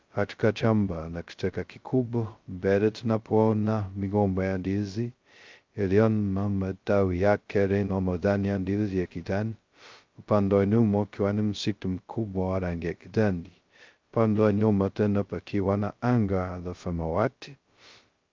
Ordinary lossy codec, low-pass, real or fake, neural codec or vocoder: Opus, 24 kbps; 7.2 kHz; fake; codec, 16 kHz, 0.2 kbps, FocalCodec